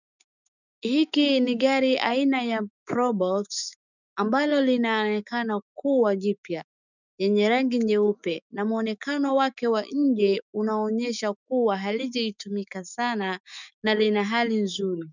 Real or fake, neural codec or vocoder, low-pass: fake; autoencoder, 48 kHz, 128 numbers a frame, DAC-VAE, trained on Japanese speech; 7.2 kHz